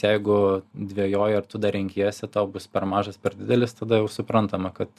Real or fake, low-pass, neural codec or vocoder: real; 14.4 kHz; none